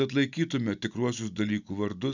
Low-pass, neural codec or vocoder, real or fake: 7.2 kHz; none; real